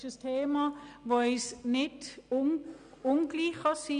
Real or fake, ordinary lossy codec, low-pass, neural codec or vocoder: real; Opus, 64 kbps; 9.9 kHz; none